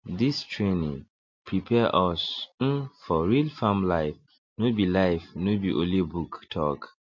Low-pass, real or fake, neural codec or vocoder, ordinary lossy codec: 7.2 kHz; real; none; MP3, 48 kbps